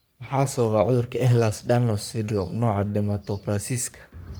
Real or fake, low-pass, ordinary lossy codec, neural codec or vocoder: fake; none; none; codec, 44.1 kHz, 3.4 kbps, Pupu-Codec